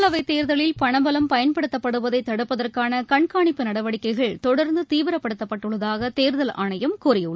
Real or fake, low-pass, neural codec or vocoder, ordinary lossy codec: real; none; none; none